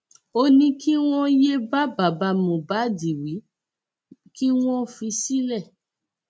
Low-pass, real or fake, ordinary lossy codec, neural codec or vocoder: none; real; none; none